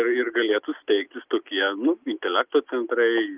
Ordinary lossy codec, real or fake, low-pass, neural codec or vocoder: Opus, 32 kbps; real; 3.6 kHz; none